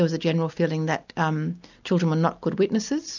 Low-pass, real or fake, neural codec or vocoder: 7.2 kHz; real; none